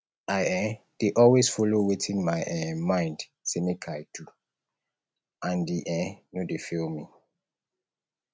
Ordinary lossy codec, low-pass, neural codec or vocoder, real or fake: none; none; none; real